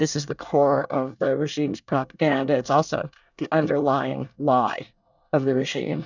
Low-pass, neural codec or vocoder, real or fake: 7.2 kHz; codec, 24 kHz, 1 kbps, SNAC; fake